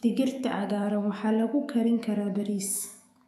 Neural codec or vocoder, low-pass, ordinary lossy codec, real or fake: autoencoder, 48 kHz, 128 numbers a frame, DAC-VAE, trained on Japanese speech; 14.4 kHz; none; fake